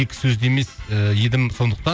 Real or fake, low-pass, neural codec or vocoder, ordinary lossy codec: real; none; none; none